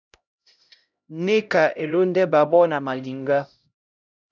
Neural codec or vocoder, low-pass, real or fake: codec, 16 kHz, 0.5 kbps, X-Codec, HuBERT features, trained on LibriSpeech; 7.2 kHz; fake